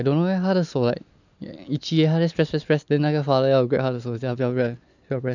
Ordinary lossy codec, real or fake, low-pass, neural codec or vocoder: none; real; 7.2 kHz; none